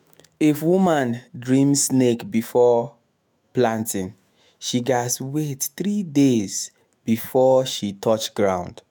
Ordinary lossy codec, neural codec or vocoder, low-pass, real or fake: none; autoencoder, 48 kHz, 128 numbers a frame, DAC-VAE, trained on Japanese speech; none; fake